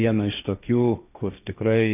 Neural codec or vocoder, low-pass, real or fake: codec, 16 kHz, 1.1 kbps, Voila-Tokenizer; 3.6 kHz; fake